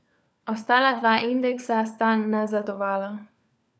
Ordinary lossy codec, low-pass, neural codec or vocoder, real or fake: none; none; codec, 16 kHz, 4 kbps, FunCodec, trained on LibriTTS, 50 frames a second; fake